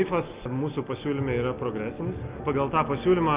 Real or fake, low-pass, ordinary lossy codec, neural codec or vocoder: real; 3.6 kHz; Opus, 32 kbps; none